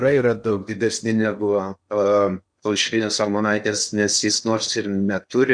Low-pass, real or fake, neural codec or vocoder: 9.9 kHz; fake; codec, 16 kHz in and 24 kHz out, 0.8 kbps, FocalCodec, streaming, 65536 codes